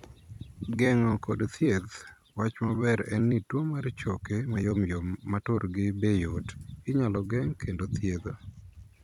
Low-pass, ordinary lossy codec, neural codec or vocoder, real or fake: 19.8 kHz; none; vocoder, 44.1 kHz, 128 mel bands every 256 samples, BigVGAN v2; fake